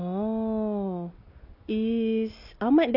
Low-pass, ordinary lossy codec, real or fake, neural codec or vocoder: 5.4 kHz; none; real; none